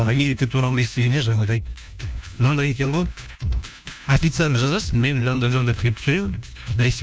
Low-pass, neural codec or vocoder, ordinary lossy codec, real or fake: none; codec, 16 kHz, 1 kbps, FunCodec, trained on LibriTTS, 50 frames a second; none; fake